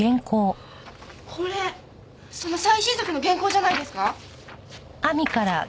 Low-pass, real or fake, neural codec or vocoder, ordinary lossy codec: none; real; none; none